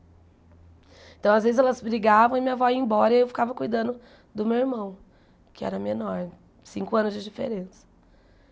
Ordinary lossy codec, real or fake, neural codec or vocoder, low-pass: none; real; none; none